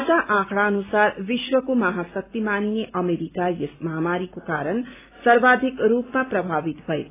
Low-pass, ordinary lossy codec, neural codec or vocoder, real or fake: 3.6 kHz; AAC, 24 kbps; none; real